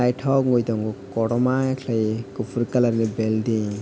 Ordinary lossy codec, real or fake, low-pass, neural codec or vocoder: none; real; none; none